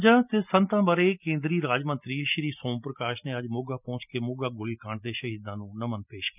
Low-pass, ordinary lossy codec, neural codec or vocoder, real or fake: 3.6 kHz; none; none; real